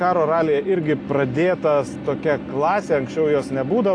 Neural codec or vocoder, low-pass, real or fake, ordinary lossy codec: none; 9.9 kHz; real; AAC, 48 kbps